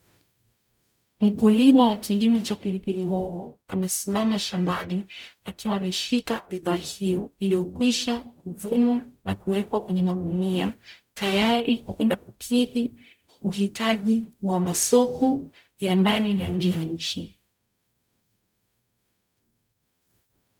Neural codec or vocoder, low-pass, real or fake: codec, 44.1 kHz, 0.9 kbps, DAC; 19.8 kHz; fake